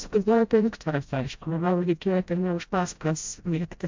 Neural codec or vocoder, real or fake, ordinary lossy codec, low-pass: codec, 16 kHz, 0.5 kbps, FreqCodec, smaller model; fake; MP3, 64 kbps; 7.2 kHz